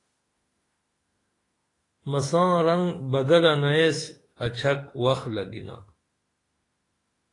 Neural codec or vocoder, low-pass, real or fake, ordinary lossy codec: autoencoder, 48 kHz, 32 numbers a frame, DAC-VAE, trained on Japanese speech; 10.8 kHz; fake; AAC, 32 kbps